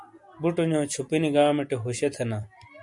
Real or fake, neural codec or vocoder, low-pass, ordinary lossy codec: real; none; 10.8 kHz; MP3, 96 kbps